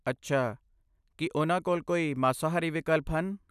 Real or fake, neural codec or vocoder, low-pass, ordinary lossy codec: real; none; 14.4 kHz; none